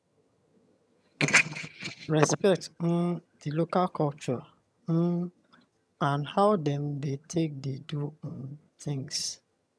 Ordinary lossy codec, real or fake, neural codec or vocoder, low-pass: none; fake; vocoder, 22.05 kHz, 80 mel bands, HiFi-GAN; none